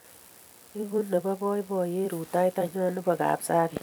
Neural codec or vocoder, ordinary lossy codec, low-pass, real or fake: vocoder, 44.1 kHz, 128 mel bands every 256 samples, BigVGAN v2; none; none; fake